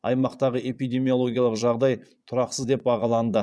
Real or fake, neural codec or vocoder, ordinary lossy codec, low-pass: fake; vocoder, 22.05 kHz, 80 mel bands, Vocos; none; none